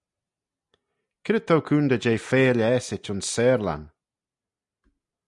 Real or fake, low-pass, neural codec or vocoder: real; 10.8 kHz; none